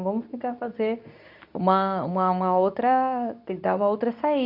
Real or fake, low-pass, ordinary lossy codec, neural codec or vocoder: fake; 5.4 kHz; none; codec, 24 kHz, 0.9 kbps, WavTokenizer, medium speech release version 2